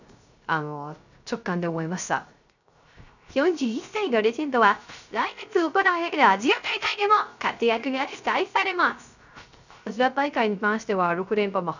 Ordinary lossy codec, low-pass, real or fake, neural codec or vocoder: none; 7.2 kHz; fake; codec, 16 kHz, 0.3 kbps, FocalCodec